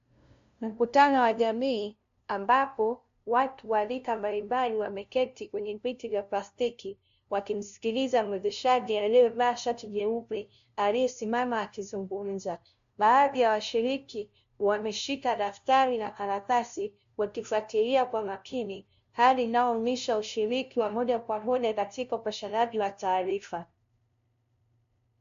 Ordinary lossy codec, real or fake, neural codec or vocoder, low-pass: AAC, 64 kbps; fake; codec, 16 kHz, 0.5 kbps, FunCodec, trained on LibriTTS, 25 frames a second; 7.2 kHz